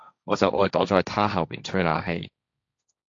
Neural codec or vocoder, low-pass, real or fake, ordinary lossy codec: codec, 16 kHz, 1.1 kbps, Voila-Tokenizer; 7.2 kHz; fake; AAC, 48 kbps